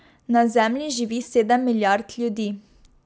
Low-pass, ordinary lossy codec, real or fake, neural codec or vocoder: none; none; real; none